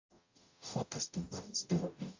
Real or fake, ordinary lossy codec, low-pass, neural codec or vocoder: fake; AAC, 48 kbps; 7.2 kHz; codec, 44.1 kHz, 0.9 kbps, DAC